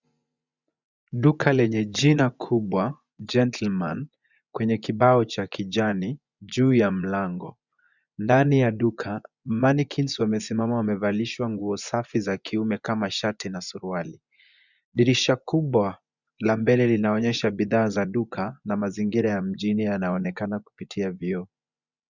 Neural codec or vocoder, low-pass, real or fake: vocoder, 24 kHz, 100 mel bands, Vocos; 7.2 kHz; fake